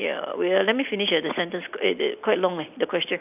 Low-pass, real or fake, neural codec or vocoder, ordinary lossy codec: 3.6 kHz; real; none; none